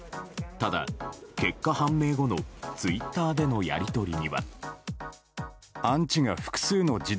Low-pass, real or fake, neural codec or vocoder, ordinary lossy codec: none; real; none; none